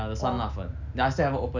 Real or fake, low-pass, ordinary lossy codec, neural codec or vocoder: real; 7.2 kHz; none; none